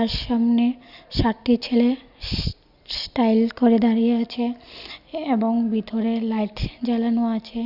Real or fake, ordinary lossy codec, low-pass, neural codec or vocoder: real; Opus, 64 kbps; 5.4 kHz; none